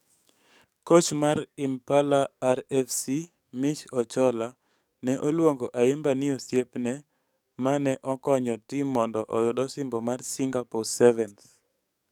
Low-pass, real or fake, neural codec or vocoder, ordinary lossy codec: 19.8 kHz; fake; codec, 44.1 kHz, 7.8 kbps, DAC; none